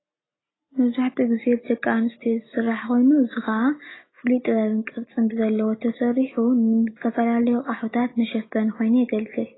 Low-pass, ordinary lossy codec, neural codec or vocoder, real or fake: 7.2 kHz; AAC, 16 kbps; none; real